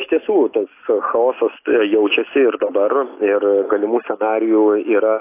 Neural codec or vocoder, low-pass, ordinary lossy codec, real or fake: none; 3.6 kHz; AAC, 24 kbps; real